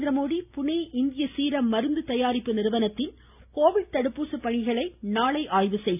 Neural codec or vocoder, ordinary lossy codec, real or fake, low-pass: none; none; real; 3.6 kHz